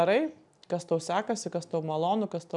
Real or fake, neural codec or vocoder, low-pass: real; none; 10.8 kHz